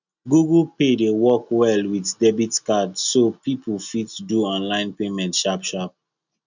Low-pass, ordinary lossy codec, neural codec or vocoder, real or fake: 7.2 kHz; none; none; real